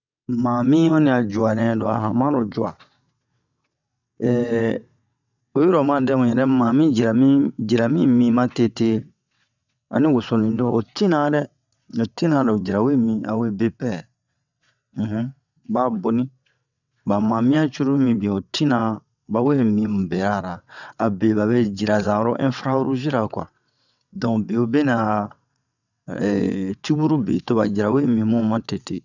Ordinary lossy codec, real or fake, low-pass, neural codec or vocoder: none; fake; 7.2 kHz; vocoder, 22.05 kHz, 80 mel bands, WaveNeXt